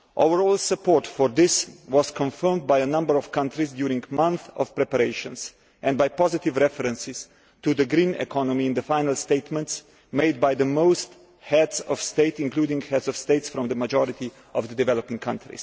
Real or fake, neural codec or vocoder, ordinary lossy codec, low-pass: real; none; none; none